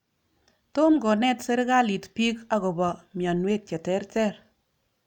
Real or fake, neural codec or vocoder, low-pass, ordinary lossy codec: real; none; 19.8 kHz; none